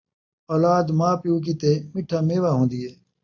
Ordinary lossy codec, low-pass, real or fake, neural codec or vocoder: AAC, 48 kbps; 7.2 kHz; real; none